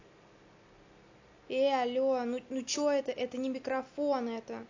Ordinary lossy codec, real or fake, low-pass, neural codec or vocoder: AAC, 48 kbps; real; 7.2 kHz; none